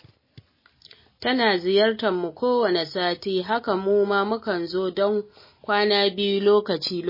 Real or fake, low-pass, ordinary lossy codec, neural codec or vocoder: real; 5.4 kHz; MP3, 24 kbps; none